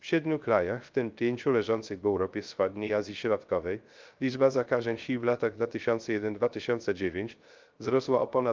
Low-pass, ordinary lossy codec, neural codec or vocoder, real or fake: 7.2 kHz; Opus, 24 kbps; codec, 16 kHz, 0.3 kbps, FocalCodec; fake